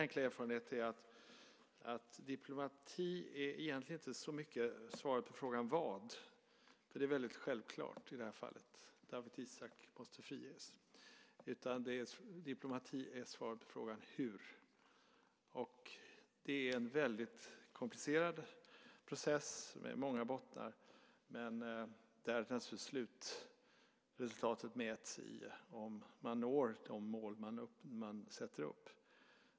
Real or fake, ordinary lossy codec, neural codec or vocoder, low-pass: real; none; none; none